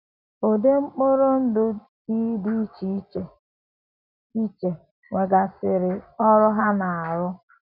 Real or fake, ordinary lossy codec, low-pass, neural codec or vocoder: real; none; 5.4 kHz; none